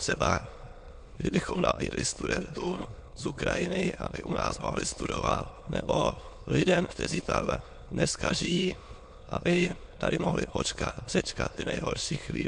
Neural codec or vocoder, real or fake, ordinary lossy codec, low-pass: autoencoder, 22.05 kHz, a latent of 192 numbers a frame, VITS, trained on many speakers; fake; MP3, 64 kbps; 9.9 kHz